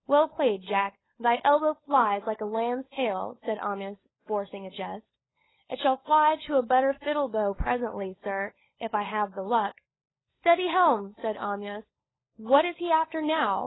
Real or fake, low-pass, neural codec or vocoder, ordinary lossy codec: fake; 7.2 kHz; codec, 16 kHz, 16 kbps, FunCodec, trained on LibriTTS, 50 frames a second; AAC, 16 kbps